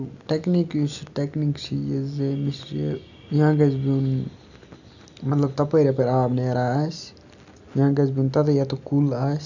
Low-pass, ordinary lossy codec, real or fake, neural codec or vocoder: 7.2 kHz; none; real; none